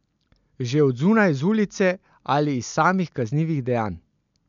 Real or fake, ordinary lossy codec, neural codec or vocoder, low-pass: real; none; none; 7.2 kHz